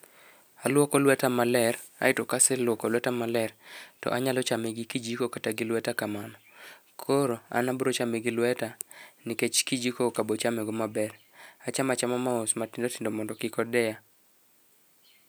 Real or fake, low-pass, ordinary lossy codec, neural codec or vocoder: real; none; none; none